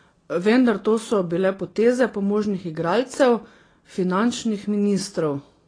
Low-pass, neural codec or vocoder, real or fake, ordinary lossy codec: 9.9 kHz; none; real; AAC, 32 kbps